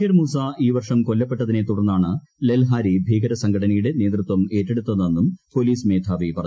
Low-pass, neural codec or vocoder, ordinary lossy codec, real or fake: none; none; none; real